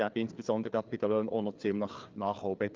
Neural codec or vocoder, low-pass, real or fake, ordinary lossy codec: codec, 16 kHz, 4 kbps, FreqCodec, larger model; 7.2 kHz; fake; Opus, 32 kbps